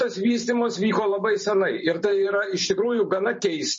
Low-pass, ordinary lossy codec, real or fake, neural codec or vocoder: 10.8 kHz; MP3, 32 kbps; fake; vocoder, 44.1 kHz, 128 mel bands every 512 samples, BigVGAN v2